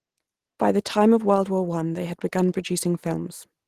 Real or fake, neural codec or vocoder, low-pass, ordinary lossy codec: real; none; 14.4 kHz; Opus, 16 kbps